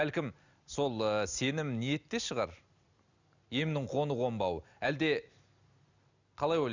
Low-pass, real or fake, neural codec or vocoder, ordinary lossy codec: 7.2 kHz; real; none; none